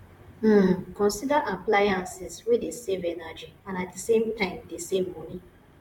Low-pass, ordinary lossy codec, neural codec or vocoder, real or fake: 19.8 kHz; MP3, 96 kbps; vocoder, 44.1 kHz, 128 mel bands, Pupu-Vocoder; fake